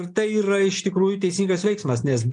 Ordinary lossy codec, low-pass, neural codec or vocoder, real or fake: MP3, 96 kbps; 9.9 kHz; none; real